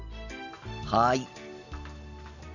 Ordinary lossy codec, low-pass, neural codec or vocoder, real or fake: none; 7.2 kHz; none; real